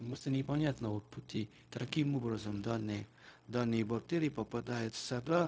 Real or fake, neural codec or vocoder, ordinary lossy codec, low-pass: fake; codec, 16 kHz, 0.4 kbps, LongCat-Audio-Codec; none; none